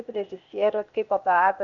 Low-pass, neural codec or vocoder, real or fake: 7.2 kHz; codec, 16 kHz, 0.8 kbps, ZipCodec; fake